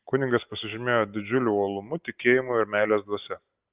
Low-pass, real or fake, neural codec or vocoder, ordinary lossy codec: 3.6 kHz; real; none; Opus, 32 kbps